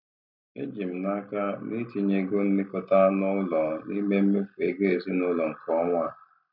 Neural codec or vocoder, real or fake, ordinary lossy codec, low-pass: none; real; none; 5.4 kHz